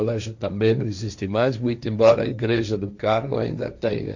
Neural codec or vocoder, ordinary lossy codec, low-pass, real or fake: codec, 16 kHz, 1.1 kbps, Voila-Tokenizer; none; 7.2 kHz; fake